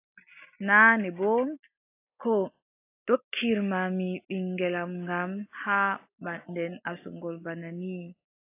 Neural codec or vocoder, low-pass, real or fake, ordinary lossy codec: none; 3.6 kHz; real; AAC, 24 kbps